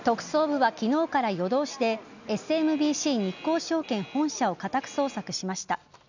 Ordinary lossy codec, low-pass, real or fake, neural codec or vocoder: none; 7.2 kHz; real; none